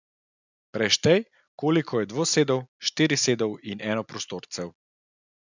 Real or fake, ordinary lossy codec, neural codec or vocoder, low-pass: real; none; none; 7.2 kHz